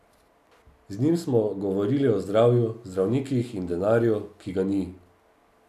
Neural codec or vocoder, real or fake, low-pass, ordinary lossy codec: none; real; 14.4 kHz; none